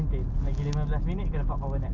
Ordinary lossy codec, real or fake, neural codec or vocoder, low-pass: Opus, 24 kbps; real; none; 7.2 kHz